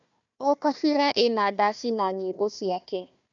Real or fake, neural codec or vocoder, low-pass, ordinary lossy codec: fake; codec, 16 kHz, 1 kbps, FunCodec, trained on Chinese and English, 50 frames a second; 7.2 kHz; none